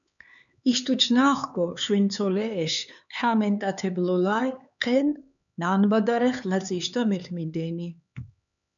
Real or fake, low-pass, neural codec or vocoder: fake; 7.2 kHz; codec, 16 kHz, 4 kbps, X-Codec, HuBERT features, trained on LibriSpeech